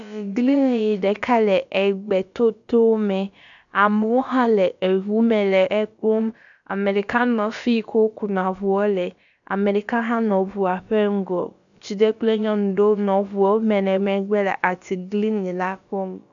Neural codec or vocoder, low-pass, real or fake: codec, 16 kHz, about 1 kbps, DyCAST, with the encoder's durations; 7.2 kHz; fake